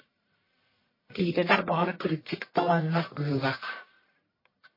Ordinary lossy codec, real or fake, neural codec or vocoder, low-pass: MP3, 24 kbps; fake; codec, 44.1 kHz, 1.7 kbps, Pupu-Codec; 5.4 kHz